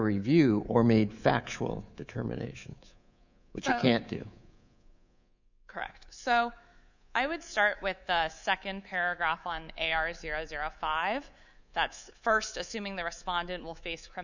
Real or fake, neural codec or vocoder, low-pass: fake; codec, 24 kHz, 3.1 kbps, DualCodec; 7.2 kHz